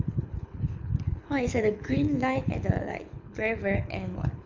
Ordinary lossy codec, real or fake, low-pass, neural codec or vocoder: MP3, 64 kbps; fake; 7.2 kHz; codec, 24 kHz, 6 kbps, HILCodec